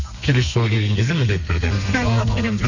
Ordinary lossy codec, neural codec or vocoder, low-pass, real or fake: none; codec, 32 kHz, 1.9 kbps, SNAC; 7.2 kHz; fake